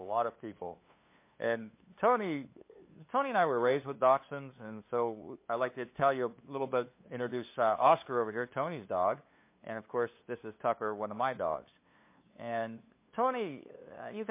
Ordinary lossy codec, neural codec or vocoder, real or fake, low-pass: MP3, 24 kbps; codec, 16 kHz, 2 kbps, FunCodec, trained on LibriTTS, 25 frames a second; fake; 3.6 kHz